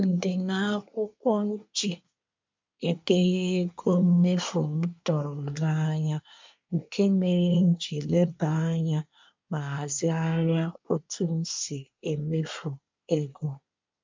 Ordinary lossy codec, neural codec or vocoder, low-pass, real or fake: MP3, 64 kbps; codec, 24 kHz, 1 kbps, SNAC; 7.2 kHz; fake